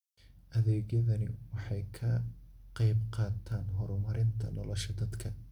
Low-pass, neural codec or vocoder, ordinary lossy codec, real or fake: 19.8 kHz; none; none; real